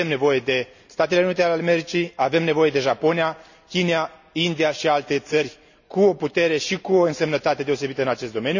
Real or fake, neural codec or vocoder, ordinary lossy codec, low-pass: real; none; none; 7.2 kHz